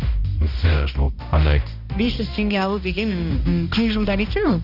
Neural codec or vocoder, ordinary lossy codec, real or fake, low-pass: codec, 16 kHz, 1 kbps, X-Codec, HuBERT features, trained on balanced general audio; none; fake; 5.4 kHz